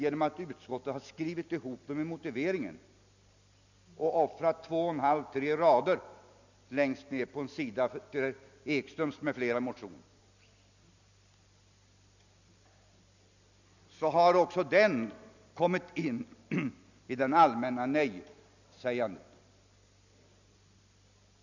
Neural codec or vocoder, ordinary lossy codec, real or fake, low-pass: none; none; real; 7.2 kHz